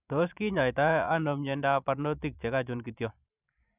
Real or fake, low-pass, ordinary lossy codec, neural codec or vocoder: fake; 3.6 kHz; none; vocoder, 44.1 kHz, 128 mel bands every 512 samples, BigVGAN v2